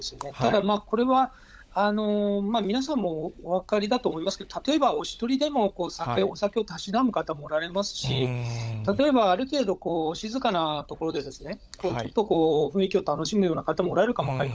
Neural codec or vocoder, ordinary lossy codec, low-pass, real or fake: codec, 16 kHz, 16 kbps, FunCodec, trained on LibriTTS, 50 frames a second; none; none; fake